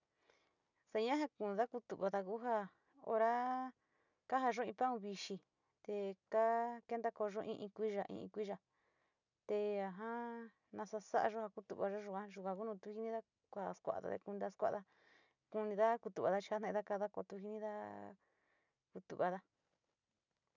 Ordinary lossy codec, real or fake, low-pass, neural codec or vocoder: none; real; 7.2 kHz; none